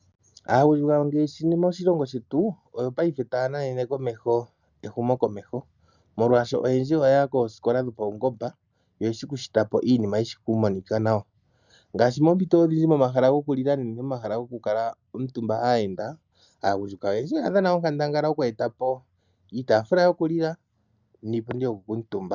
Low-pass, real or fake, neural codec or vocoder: 7.2 kHz; real; none